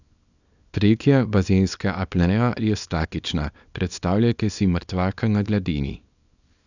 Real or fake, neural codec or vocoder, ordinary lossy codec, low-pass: fake; codec, 24 kHz, 0.9 kbps, WavTokenizer, small release; none; 7.2 kHz